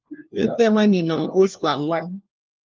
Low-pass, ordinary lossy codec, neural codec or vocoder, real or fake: 7.2 kHz; Opus, 32 kbps; codec, 16 kHz, 1 kbps, FunCodec, trained on LibriTTS, 50 frames a second; fake